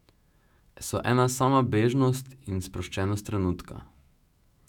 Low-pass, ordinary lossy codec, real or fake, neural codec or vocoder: 19.8 kHz; none; fake; autoencoder, 48 kHz, 128 numbers a frame, DAC-VAE, trained on Japanese speech